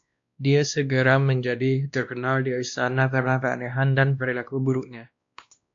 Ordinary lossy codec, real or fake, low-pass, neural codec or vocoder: AAC, 64 kbps; fake; 7.2 kHz; codec, 16 kHz, 1 kbps, X-Codec, WavLM features, trained on Multilingual LibriSpeech